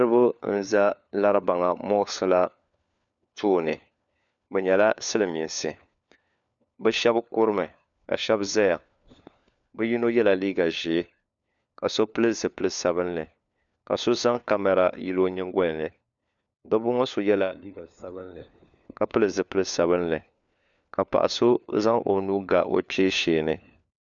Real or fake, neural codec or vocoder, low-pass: fake; codec, 16 kHz, 4 kbps, FunCodec, trained on LibriTTS, 50 frames a second; 7.2 kHz